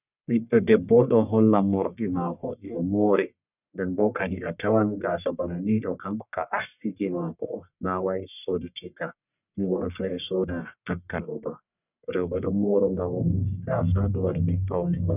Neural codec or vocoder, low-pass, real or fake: codec, 44.1 kHz, 1.7 kbps, Pupu-Codec; 3.6 kHz; fake